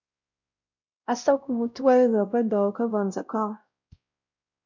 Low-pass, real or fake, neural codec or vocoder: 7.2 kHz; fake; codec, 16 kHz, 0.5 kbps, X-Codec, WavLM features, trained on Multilingual LibriSpeech